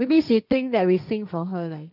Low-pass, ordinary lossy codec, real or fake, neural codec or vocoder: 5.4 kHz; none; fake; codec, 16 kHz, 1.1 kbps, Voila-Tokenizer